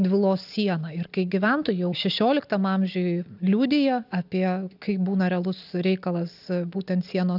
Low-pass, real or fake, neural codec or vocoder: 5.4 kHz; real; none